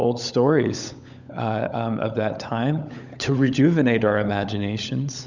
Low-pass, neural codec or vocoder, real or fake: 7.2 kHz; codec, 16 kHz, 16 kbps, FunCodec, trained on LibriTTS, 50 frames a second; fake